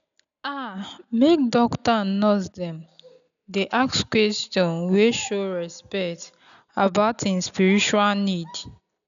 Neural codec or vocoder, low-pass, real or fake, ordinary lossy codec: none; 7.2 kHz; real; none